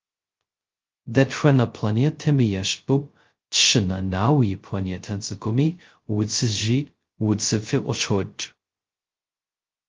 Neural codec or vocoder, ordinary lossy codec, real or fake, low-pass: codec, 16 kHz, 0.2 kbps, FocalCodec; Opus, 24 kbps; fake; 7.2 kHz